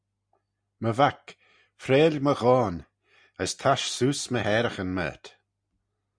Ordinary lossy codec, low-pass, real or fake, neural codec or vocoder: Opus, 64 kbps; 9.9 kHz; real; none